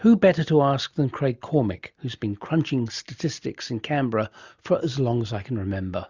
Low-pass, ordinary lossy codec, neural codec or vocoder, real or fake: 7.2 kHz; Opus, 64 kbps; none; real